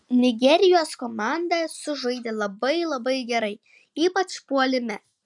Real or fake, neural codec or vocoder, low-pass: real; none; 10.8 kHz